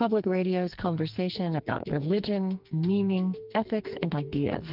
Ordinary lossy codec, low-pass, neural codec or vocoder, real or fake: Opus, 32 kbps; 5.4 kHz; codec, 44.1 kHz, 2.6 kbps, SNAC; fake